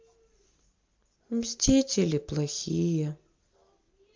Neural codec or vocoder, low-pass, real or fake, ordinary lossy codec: none; 7.2 kHz; real; Opus, 24 kbps